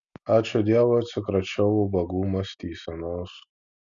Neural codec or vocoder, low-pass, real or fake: none; 7.2 kHz; real